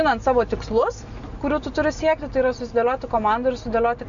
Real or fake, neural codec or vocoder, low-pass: real; none; 7.2 kHz